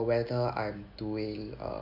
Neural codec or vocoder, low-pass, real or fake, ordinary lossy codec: none; 5.4 kHz; real; none